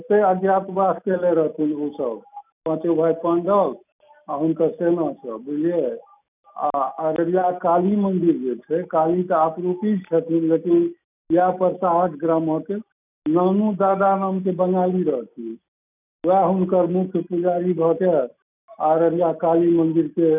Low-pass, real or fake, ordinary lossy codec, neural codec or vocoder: 3.6 kHz; real; none; none